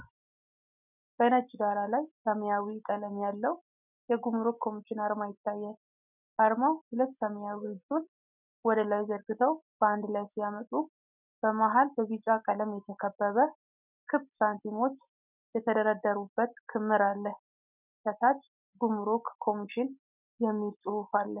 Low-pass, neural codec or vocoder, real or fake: 3.6 kHz; none; real